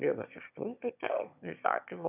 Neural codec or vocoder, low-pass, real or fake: autoencoder, 22.05 kHz, a latent of 192 numbers a frame, VITS, trained on one speaker; 3.6 kHz; fake